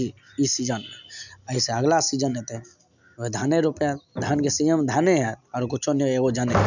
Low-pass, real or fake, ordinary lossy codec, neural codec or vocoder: 7.2 kHz; real; none; none